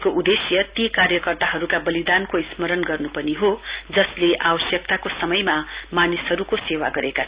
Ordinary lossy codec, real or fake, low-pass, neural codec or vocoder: Opus, 64 kbps; real; 3.6 kHz; none